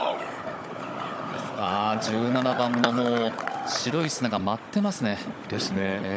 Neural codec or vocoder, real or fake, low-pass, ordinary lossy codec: codec, 16 kHz, 16 kbps, FunCodec, trained on Chinese and English, 50 frames a second; fake; none; none